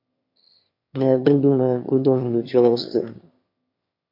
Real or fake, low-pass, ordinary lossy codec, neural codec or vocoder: fake; 5.4 kHz; MP3, 32 kbps; autoencoder, 22.05 kHz, a latent of 192 numbers a frame, VITS, trained on one speaker